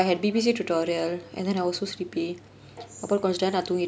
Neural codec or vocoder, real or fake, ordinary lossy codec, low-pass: none; real; none; none